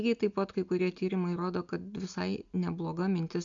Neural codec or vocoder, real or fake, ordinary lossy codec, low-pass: none; real; MP3, 64 kbps; 7.2 kHz